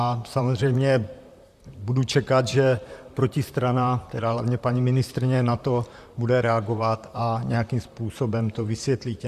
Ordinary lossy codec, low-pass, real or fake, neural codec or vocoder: Opus, 64 kbps; 14.4 kHz; fake; vocoder, 44.1 kHz, 128 mel bands, Pupu-Vocoder